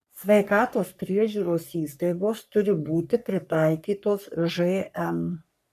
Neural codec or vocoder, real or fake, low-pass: codec, 44.1 kHz, 3.4 kbps, Pupu-Codec; fake; 14.4 kHz